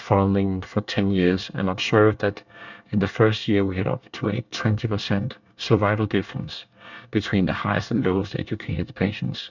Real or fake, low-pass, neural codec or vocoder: fake; 7.2 kHz; codec, 24 kHz, 1 kbps, SNAC